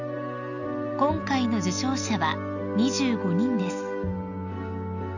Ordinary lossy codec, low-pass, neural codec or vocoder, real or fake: none; 7.2 kHz; none; real